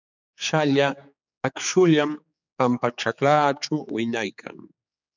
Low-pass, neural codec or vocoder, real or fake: 7.2 kHz; codec, 16 kHz, 4 kbps, X-Codec, HuBERT features, trained on general audio; fake